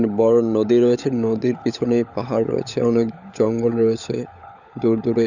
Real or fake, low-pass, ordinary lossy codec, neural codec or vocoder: fake; 7.2 kHz; none; codec, 16 kHz, 16 kbps, FunCodec, trained on LibriTTS, 50 frames a second